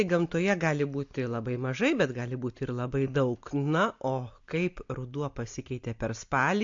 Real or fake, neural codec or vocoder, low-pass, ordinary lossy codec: real; none; 7.2 kHz; MP3, 48 kbps